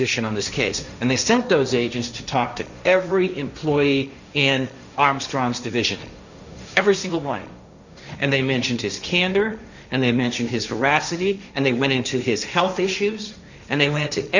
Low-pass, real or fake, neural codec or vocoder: 7.2 kHz; fake; codec, 16 kHz, 1.1 kbps, Voila-Tokenizer